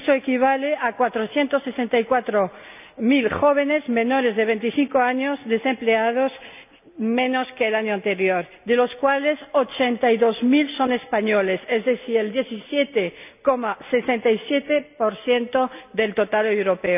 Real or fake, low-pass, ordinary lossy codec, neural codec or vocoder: real; 3.6 kHz; none; none